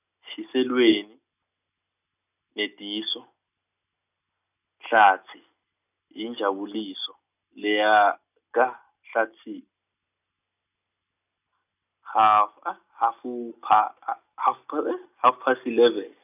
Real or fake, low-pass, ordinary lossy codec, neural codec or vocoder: real; 3.6 kHz; none; none